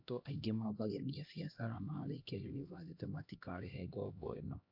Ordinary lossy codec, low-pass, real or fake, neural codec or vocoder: AAC, 48 kbps; 5.4 kHz; fake; codec, 16 kHz, 1 kbps, X-Codec, HuBERT features, trained on LibriSpeech